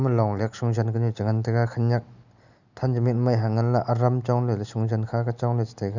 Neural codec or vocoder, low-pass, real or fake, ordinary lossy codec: none; 7.2 kHz; real; none